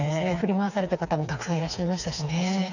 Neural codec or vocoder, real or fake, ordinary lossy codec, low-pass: codec, 16 kHz, 4 kbps, FreqCodec, smaller model; fake; none; 7.2 kHz